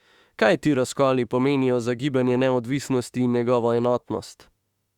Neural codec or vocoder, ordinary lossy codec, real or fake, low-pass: autoencoder, 48 kHz, 32 numbers a frame, DAC-VAE, trained on Japanese speech; Opus, 64 kbps; fake; 19.8 kHz